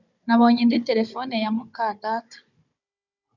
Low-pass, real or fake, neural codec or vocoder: 7.2 kHz; fake; codec, 16 kHz, 16 kbps, FunCodec, trained on Chinese and English, 50 frames a second